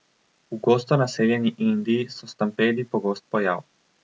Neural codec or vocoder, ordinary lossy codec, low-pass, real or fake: none; none; none; real